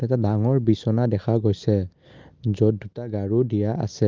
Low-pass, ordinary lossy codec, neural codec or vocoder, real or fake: 7.2 kHz; Opus, 24 kbps; none; real